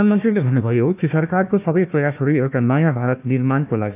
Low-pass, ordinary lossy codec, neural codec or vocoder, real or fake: 3.6 kHz; none; codec, 16 kHz, 1 kbps, FunCodec, trained on Chinese and English, 50 frames a second; fake